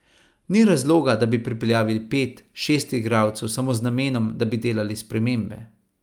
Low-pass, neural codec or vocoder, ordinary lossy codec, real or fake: 19.8 kHz; none; Opus, 32 kbps; real